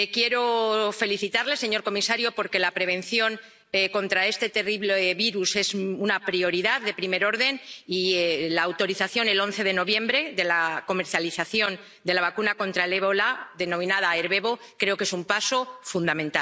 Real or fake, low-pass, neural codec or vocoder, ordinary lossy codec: real; none; none; none